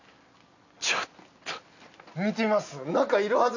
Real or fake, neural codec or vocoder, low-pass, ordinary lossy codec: real; none; 7.2 kHz; none